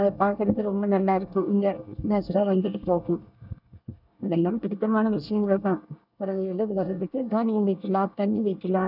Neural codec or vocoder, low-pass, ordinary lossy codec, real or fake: codec, 24 kHz, 1 kbps, SNAC; 5.4 kHz; none; fake